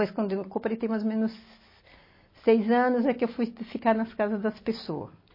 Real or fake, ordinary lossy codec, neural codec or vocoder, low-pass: real; MP3, 24 kbps; none; 5.4 kHz